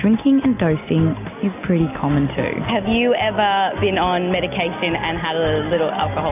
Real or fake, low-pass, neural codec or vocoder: real; 3.6 kHz; none